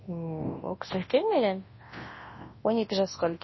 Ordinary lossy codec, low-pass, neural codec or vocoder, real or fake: MP3, 24 kbps; 7.2 kHz; codec, 24 kHz, 0.9 kbps, WavTokenizer, large speech release; fake